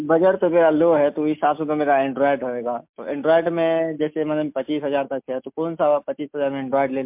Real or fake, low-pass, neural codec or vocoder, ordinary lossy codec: real; 3.6 kHz; none; MP3, 32 kbps